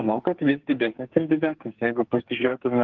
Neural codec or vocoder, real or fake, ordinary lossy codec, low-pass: codec, 44.1 kHz, 2.6 kbps, SNAC; fake; Opus, 16 kbps; 7.2 kHz